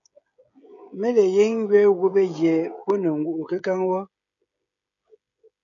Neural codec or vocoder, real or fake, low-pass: codec, 16 kHz, 16 kbps, FreqCodec, smaller model; fake; 7.2 kHz